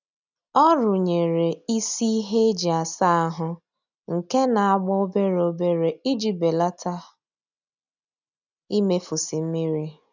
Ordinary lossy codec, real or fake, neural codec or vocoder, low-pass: none; real; none; 7.2 kHz